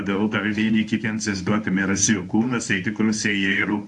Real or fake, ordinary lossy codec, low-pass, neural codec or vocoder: fake; AAC, 64 kbps; 10.8 kHz; codec, 24 kHz, 0.9 kbps, WavTokenizer, medium speech release version 1